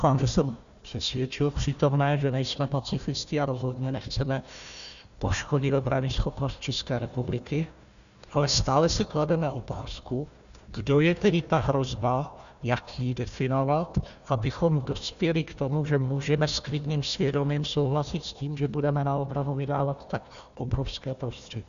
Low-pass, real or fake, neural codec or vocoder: 7.2 kHz; fake; codec, 16 kHz, 1 kbps, FunCodec, trained on Chinese and English, 50 frames a second